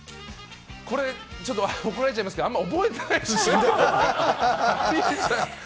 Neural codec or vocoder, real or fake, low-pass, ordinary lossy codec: none; real; none; none